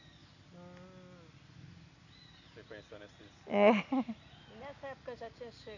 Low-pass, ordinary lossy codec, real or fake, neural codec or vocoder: 7.2 kHz; none; real; none